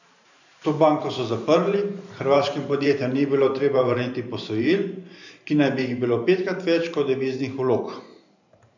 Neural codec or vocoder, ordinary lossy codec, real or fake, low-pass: vocoder, 44.1 kHz, 128 mel bands every 512 samples, BigVGAN v2; none; fake; 7.2 kHz